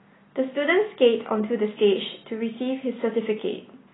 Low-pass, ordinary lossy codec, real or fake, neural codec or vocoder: 7.2 kHz; AAC, 16 kbps; real; none